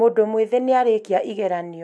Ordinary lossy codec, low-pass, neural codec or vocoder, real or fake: none; none; none; real